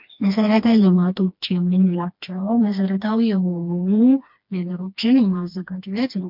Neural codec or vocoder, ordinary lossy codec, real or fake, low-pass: codec, 16 kHz, 2 kbps, FreqCodec, smaller model; MP3, 48 kbps; fake; 5.4 kHz